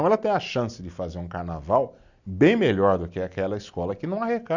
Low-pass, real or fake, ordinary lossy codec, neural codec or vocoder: 7.2 kHz; real; none; none